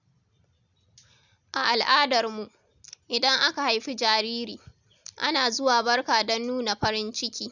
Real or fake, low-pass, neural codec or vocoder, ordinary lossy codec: real; 7.2 kHz; none; none